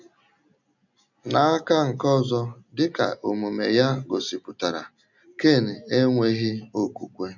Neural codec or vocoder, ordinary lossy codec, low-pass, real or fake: none; none; 7.2 kHz; real